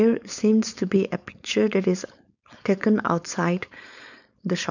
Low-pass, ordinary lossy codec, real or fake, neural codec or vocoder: 7.2 kHz; none; fake; codec, 16 kHz, 4.8 kbps, FACodec